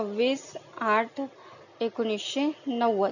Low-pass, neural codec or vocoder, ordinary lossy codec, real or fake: 7.2 kHz; none; none; real